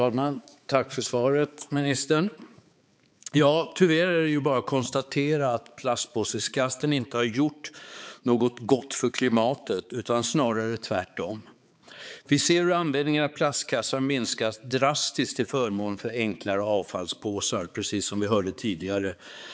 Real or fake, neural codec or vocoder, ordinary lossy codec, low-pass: fake; codec, 16 kHz, 4 kbps, X-Codec, HuBERT features, trained on balanced general audio; none; none